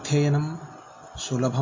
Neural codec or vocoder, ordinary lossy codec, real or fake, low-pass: none; MP3, 32 kbps; real; 7.2 kHz